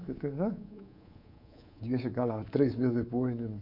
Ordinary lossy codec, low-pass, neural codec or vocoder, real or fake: MP3, 48 kbps; 5.4 kHz; codec, 24 kHz, 3.1 kbps, DualCodec; fake